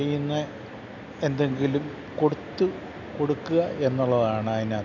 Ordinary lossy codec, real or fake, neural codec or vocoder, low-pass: none; real; none; 7.2 kHz